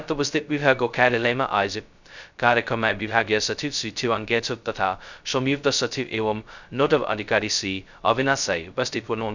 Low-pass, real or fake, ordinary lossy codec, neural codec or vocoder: 7.2 kHz; fake; none; codec, 16 kHz, 0.2 kbps, FocalCodec